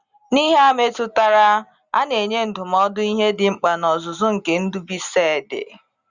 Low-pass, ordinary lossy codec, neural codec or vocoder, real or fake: 7.2 kHz; Opus, 64 kbps; none; real